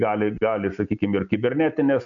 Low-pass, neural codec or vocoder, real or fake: 7.2 kHz; none; real